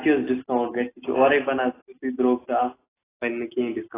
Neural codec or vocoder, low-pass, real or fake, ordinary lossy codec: none; 3.6 kHz; real; AAC, 16 kbps